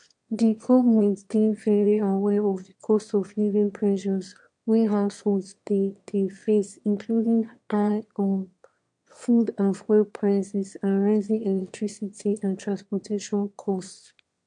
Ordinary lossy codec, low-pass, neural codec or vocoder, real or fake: MP3, 64 kbps; 9.9 kHz; autoencoder, 22.05 kHz, a latent of 192 numbers a frame, VITS, trained on one speaker; fake